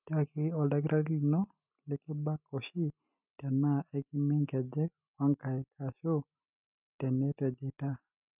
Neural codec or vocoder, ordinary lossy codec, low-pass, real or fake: none; none; 3.6 kHz; real